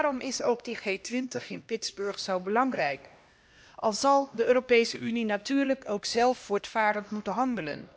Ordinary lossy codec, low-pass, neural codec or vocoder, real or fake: none; none; codec, 16 kHz, 1 kbps, X-Codec, HuBERT features, trained on LibriSpeech; fake